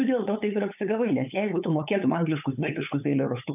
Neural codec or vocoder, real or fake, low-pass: codec, 16 kHz, 8 kbps, FunCodec, trained on LibriTTS, 25 frames a second; fake; 3.6 kHz